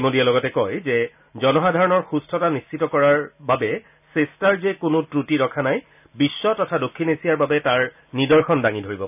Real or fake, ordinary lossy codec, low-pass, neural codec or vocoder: real; none; 3.6 kHz; none